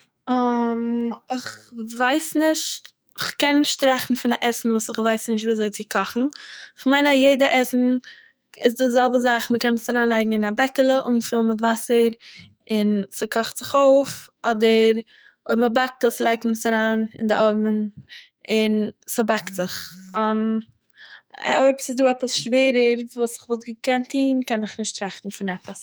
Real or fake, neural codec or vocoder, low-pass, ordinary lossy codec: fake; codec, 44.1 kHz, 2.6 kbps, SNAC; none; none